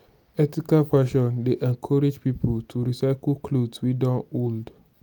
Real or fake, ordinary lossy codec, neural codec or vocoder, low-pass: real; none; none; none